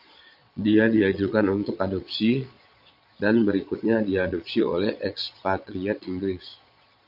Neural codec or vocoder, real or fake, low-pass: vocoder, 22.05 kHz, 80 mel bands, Vocos; fake; 5.4 kHz